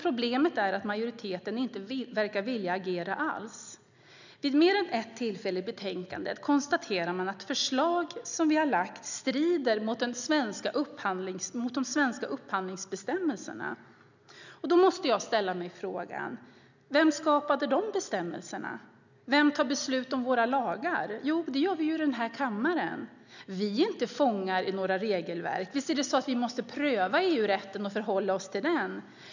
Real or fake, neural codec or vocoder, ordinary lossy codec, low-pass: real; none; none; 7.2 kHz